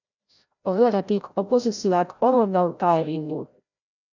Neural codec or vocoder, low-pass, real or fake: codec, 16 kHz, 0.5 kbps, FreqCodec, larger model; 7.2 kHz; fake